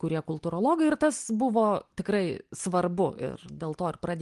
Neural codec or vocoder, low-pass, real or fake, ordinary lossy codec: none; 10.8 kHz; real; Opus, 24 kbps